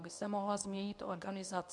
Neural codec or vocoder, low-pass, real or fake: codec, 24 kHz, 0.9 kbps, WavTokenizer, medium speech release version 2; 10.8 kHz; fake